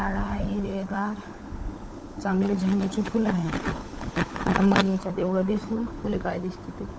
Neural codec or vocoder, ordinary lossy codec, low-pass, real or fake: codec, 16 kHz, 16 kbps, FunCodec, trained on LibriTTS, 50 frames a second; none; none; fake